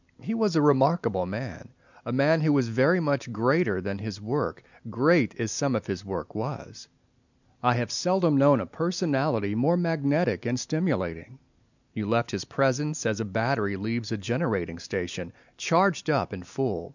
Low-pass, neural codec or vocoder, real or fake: 7.2 kHz; none; real